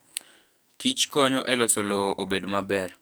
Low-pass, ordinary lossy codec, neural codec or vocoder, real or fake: none; none; codec, 44.1 kHz, 2.6 kbps, SNAC; fake